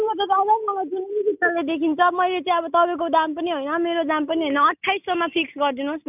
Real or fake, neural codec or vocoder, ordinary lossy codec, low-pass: real; none; none; 3.6 kHz